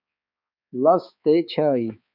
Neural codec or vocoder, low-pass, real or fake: codec, 16 kHz, 4 kbps, X-Codec, HuBERT features, trained on balanced general audio; 5.4 kHz; fake